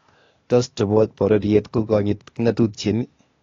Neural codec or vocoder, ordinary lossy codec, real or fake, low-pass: codec, 16 kHz, 0.8 kbps, ZipCodec; AAC, 32 kbps; fake; 7.2 kHz